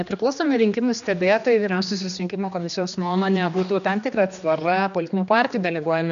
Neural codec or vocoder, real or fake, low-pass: codec, 16 kHz, 2 kbps, X-Codec, HuBERT features, trained on general audio; fake; 7.2 kHz